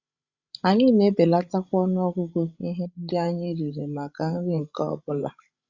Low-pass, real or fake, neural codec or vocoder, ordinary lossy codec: 7.2 kHz; fake; codec, 16 kHz, 16 kbps, FreqCodec, larger model; Opus, 64 kbps